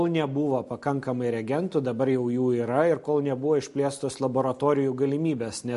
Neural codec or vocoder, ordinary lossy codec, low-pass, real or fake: none; MP3, 48 kbps; 14.4 kHz; real